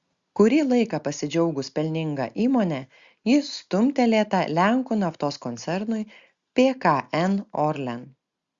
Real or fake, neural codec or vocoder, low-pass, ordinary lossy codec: real; none; 7.2 kHz; Opus, 64 kbps